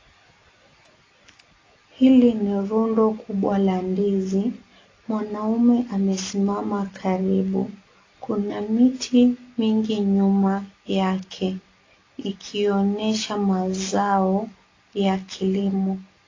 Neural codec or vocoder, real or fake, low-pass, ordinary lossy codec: none; real; 7.2 kHz; AAC, 32 kbps